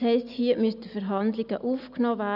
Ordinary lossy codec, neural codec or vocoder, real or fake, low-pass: none; none; real; 5.4 kHz